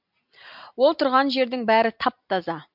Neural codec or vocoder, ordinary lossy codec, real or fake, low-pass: none; MP3, 48 kbps; real; 5.4 kHz